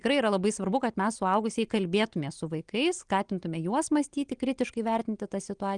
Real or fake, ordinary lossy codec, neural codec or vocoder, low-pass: real; Opus, 24 kbps; none; 9.9 kHz